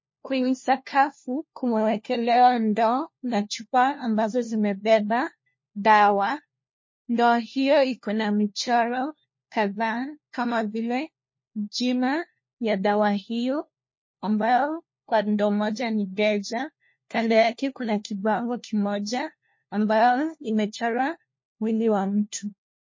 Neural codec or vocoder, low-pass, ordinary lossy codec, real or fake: codec, 16 kHz, 1 kbps, FunCodec, trained on LibriTTS, 50 frames a second; 7.2 kHz; MP3, 32 kbps; fake